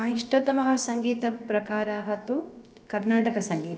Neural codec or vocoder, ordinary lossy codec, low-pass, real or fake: codec, 16 kHz, about 1 kbps, DyCAST, with the encoder's durations; none; none; fake